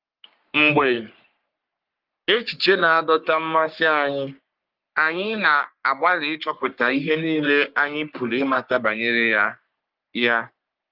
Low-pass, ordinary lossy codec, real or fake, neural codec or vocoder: 5.4 kHz; Opus, 32 kbps; fake; codec, 44.1 kHz, 3.4 kbps, Pupu-Codec